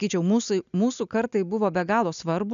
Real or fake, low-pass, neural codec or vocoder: real; 7.2 kHz; none